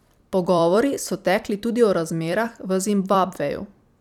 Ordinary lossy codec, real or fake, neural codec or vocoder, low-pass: none; fake; vocoder, 44.1 kHz, 128 mel bands every 256 samples, BigVGAN v2; 19.8 kHz